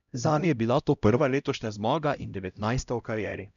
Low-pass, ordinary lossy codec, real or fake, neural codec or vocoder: 7.2 kHz; none; fake; codec, 16 kHz, 0.5 kbps, X-Codec, HuBERT features, trained on LibriSpeech